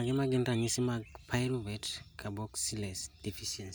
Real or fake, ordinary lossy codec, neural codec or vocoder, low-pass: real; none; none; none